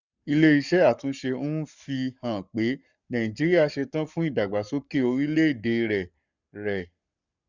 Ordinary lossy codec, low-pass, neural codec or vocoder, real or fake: none; 7.2 kHz; codec, 44.1 kHz, 7.8 kbps, Pupu-Codec; fake